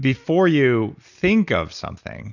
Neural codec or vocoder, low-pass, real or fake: none; 7.2 kHz; real